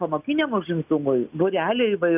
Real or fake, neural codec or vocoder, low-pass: real; none; 3.6 kHz